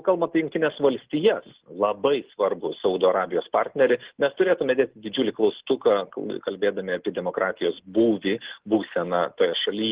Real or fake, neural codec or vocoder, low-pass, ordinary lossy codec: real; none; 3.6 kHz; Opus, 16 kbps